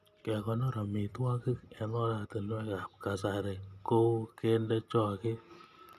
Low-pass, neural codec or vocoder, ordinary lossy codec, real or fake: 14.4 kHz; none; none; real